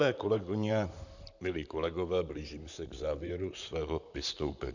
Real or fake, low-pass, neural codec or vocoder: fake; 7.2 kHz; vocoder, 44.1 kHz, 128 mel bands, Pupu-Vocoder